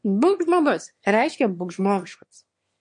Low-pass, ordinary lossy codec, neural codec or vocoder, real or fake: 9.9 kHz; MP3, 48 kbps; autoencoder, 22.05 kHz, a latent of 192 numbers a frame, VITS, trained on one speaker; fake